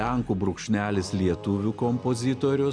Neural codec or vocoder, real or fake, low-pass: none; real; 9.9 kHz